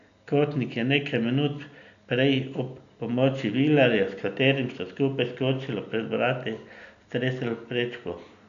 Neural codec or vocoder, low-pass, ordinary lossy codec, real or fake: none; 7.2 kHz; none; real